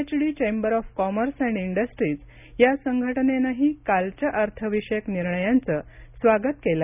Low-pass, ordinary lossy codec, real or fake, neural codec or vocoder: 3.6 kHz; none; real; none